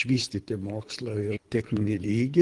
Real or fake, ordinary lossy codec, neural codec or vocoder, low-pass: fake; Opus, 24 kbps; codec, 24 kHz, 3 kbps, HILCodec; 10.8 kHz